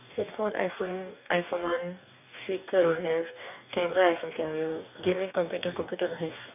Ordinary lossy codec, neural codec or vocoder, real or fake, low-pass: none; codec, 44.1 kHz, 2.6 kbps, DAC; fake; 3.6 kHz